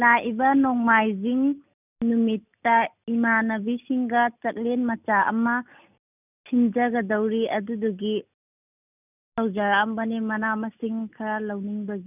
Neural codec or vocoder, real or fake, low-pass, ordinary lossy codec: none; real; 3.6 kHz; none